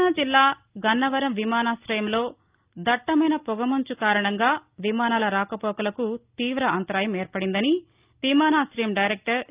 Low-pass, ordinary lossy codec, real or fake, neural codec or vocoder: 3.6 kHz; Opus, 32 kbps; real; none